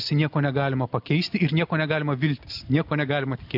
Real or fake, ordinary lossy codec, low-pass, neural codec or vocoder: real; AAC, 48 kbps; 5.4 kHz; none